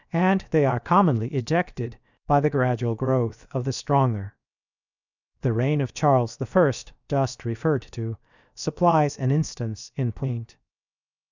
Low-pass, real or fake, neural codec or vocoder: 7.2 kHz; fake; codec, 16 kHz, 0.7 kbps, FocalCodec